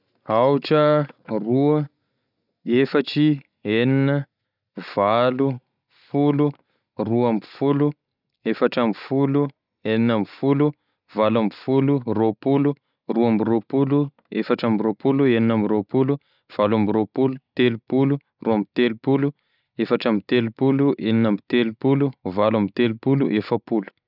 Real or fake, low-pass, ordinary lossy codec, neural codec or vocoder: real; 5.4 kHz; none; none